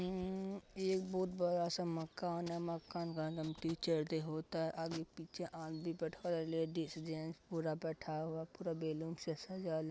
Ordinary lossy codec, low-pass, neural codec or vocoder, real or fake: none; none; none; real